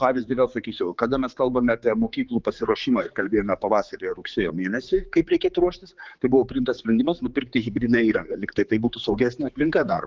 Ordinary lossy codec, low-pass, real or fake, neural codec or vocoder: Opus, 32 kbps; 7.2 kHz; fake; codec, 16 kHz, 4 kbps, X-Codec, HuBERT features, trained on general audio